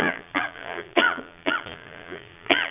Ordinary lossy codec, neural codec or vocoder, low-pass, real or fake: none; vocoder, 22.05 kHz, 80 mel bands, Vocos; 3.6 kHz; fake